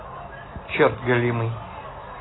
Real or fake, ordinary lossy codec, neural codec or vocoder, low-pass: real; AAC, 16 kbps; none; 7.2 kHz